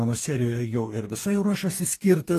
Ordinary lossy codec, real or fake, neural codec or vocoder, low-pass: AAC, 48 kbps; fake; codec, 44.1 kHz, 2.6 kbps, DAC; 14.4 kHz